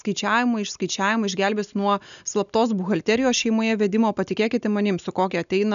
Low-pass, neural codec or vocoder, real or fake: 7.2 kHz; none; real